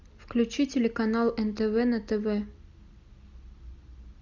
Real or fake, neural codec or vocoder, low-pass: real; none; 7.2 kHz